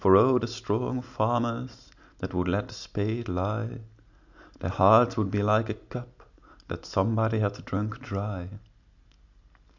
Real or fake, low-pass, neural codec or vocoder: real; 7.2 kHz; none